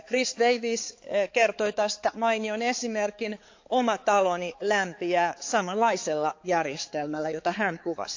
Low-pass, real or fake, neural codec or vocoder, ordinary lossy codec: 7.2 kHz; fake; codec, 16 kHz, 4 kbps, X-Codec, HuBERT features, trained on balanced general audio; AAC, 48 kbps